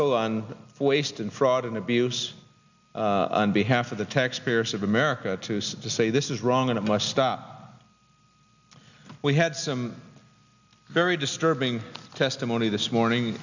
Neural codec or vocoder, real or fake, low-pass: none; real; 7.2 kHz